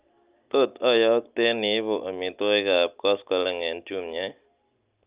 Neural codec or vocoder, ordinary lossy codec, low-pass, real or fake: none; Opus, 24 kbps; 3.6 kHz; real